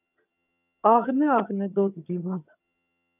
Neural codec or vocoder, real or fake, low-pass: vocoder, 22.05 kHz, 80 mel bands, HiFi-GAN; fake; 3.6 kHz